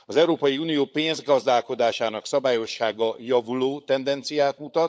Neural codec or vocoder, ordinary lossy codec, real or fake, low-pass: codec, 16 kHz, 16 kbps, FunCodec, trained on LibriTTS, 50 frames a second; none; fake; none